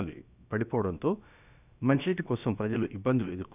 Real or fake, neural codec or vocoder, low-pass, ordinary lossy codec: fake; codec, 16 kHz, about 1 kbps, DyCAST, with the encoder's durations; 3.6 kHz; none